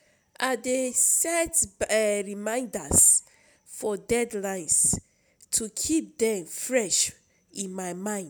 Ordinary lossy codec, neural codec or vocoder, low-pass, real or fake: none; none; none; real